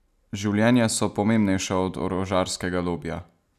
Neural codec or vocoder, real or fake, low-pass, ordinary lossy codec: none; real; 14.4 kHz; none